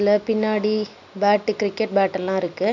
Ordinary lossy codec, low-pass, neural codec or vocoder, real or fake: none; 7.2 kHz; none; real